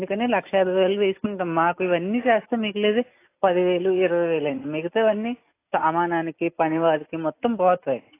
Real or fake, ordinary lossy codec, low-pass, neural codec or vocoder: real; AAC, 24 kbps; 3.6 kHz; none